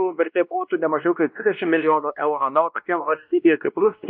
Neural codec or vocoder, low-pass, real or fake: codec, 16 kHz, 1 kbps, X-Codec, WavLM features, trained on Multilingual LibriSpeech; 5.4 kHz; fake